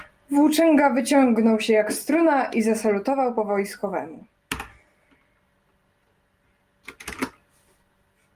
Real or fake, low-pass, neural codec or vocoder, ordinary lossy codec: real; 14.4 kHz; none; Opus, 32 kbps